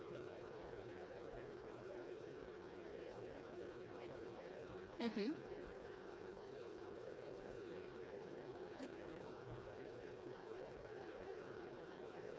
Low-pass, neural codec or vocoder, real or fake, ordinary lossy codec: none; codec, 16 kHz, 2 kbps, FreqCodec, smaller model; fake; none